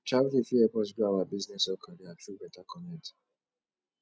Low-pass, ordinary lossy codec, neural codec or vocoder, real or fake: none; none; none; real